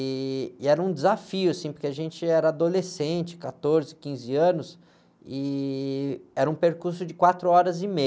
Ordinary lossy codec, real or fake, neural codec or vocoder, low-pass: none; real; none; none